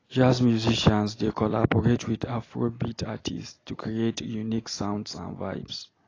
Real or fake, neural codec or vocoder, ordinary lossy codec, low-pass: real; none; AAC, 32 kbps; 7.2 kHz